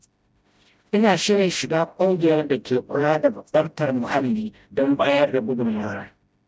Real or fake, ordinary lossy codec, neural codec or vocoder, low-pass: fake; none; codec, 16 kHz, 0.5 kbps, FreqCodec, smaller model; none